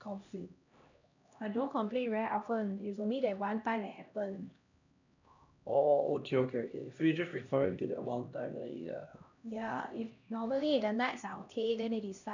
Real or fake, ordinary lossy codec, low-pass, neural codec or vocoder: fake; none; 7.2 kHz; codec, 16 kHz, 1 kbps, X-Codec, HuBERT features, trained on LibriSpeech